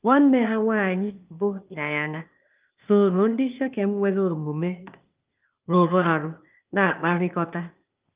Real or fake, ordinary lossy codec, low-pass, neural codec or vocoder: fake; Opus, 32 kbps; 3.6 kHz; codec, 16 kHz, 0.8 kbps, ZipCodec